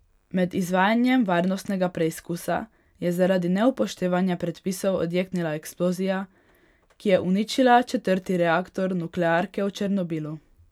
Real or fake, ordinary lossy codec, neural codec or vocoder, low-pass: real; none; none; 19.8 kHz